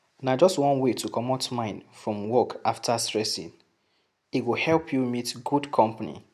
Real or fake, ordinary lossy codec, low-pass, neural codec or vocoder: real; none; 14.4 kHz; none